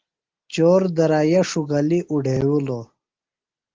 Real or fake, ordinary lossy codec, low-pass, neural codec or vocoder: real; Opus, 16 kbps; 7.2 kHz; none